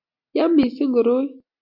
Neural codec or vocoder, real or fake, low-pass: none; real; 5.4 kHz